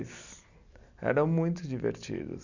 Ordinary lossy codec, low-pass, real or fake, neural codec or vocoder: none; 7.2 kHz; fake; vocoder, 44.1 kHz, 128 mel bands every 256 samples, BigVGAN v2